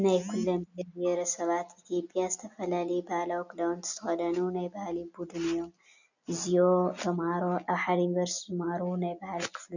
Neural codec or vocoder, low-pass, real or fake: none; 7.2 kHz; real